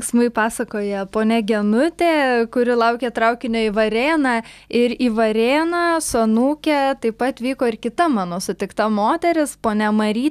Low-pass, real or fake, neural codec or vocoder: 14.4 kHz; real; none